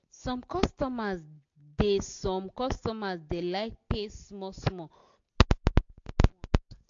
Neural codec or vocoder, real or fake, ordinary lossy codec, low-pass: none; real; AAC, 48 kbps; 7.2 kHz